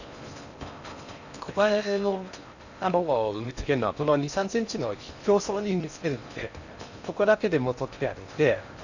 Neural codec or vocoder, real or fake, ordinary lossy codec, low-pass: codec, 16 kHz in and 24 kHz out, 0.6 kbps, FocalCodec, streaming, 4096 codes; fake; none; 7.2 kHz